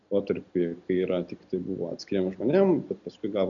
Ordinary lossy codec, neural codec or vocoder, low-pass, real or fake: MP3, 96 kbps; none; 7.2 kHz; real